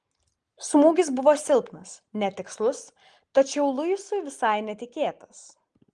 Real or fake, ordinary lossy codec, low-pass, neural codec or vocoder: real; Opus, 24 kbps; 10.8 kHz; none